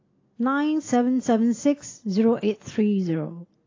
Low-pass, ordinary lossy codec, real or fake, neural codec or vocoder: 7.2 kHz; AAC, 32 kbps; real; none